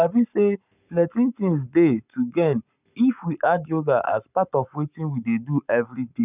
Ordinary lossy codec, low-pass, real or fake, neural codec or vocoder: none; 3.6 kHz; fake; codec, 44.1 kHz, 7.8 kbps, Pupu-Codec